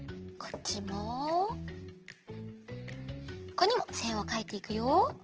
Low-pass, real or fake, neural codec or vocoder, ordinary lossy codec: 7.2 kHz; real; none; Opus, 16 kbps